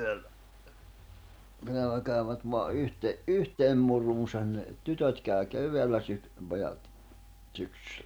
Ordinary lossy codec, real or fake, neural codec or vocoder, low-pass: none; real; none; none